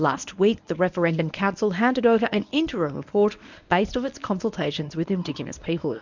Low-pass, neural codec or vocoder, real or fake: 7.2 kHz; codec, 24 kHz, 0.9 kbps, WavTokenizer, medium speech release version 1; fake